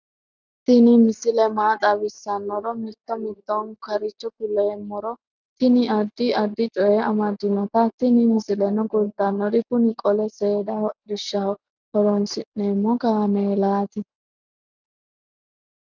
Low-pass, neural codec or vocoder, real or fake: 7.2 kHz; none; real